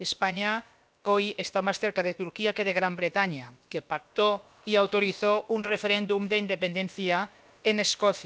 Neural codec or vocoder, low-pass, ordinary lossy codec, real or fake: codec, 16 kHz, about 1 kbps, DyCAST, with the encoder's durations; none; none; fake